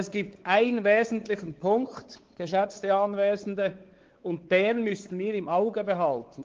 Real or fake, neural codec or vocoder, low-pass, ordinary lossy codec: fake; codec, 16 kHz, 4 kbps, X-Codec, WavLM features, trained on Multilingual LibriSpeech; 7.2 kHz; Opus, 16 kbps